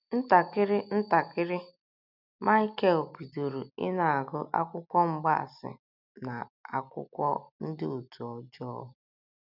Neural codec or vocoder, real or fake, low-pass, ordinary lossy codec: none; real; 5.4 kHz; none